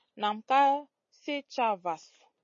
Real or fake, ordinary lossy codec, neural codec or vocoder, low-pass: real; MP3, 32 kbps; none; 7.2 kHz